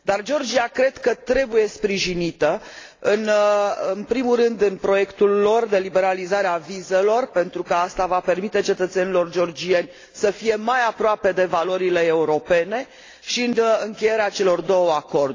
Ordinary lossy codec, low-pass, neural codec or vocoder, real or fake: AAC, 32 kbps; 7.2 kHz; none; real